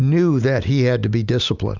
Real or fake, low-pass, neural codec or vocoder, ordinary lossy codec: real; 7.2 kHz; none; Opus, 64 kbps